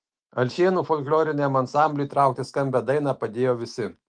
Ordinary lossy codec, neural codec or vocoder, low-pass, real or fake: Opus, 24 kbps; autoencoder, 48 kHz, 128 numbers a frame, DAC-VAE, trained on Japanese speech; 9.9 kHz; fake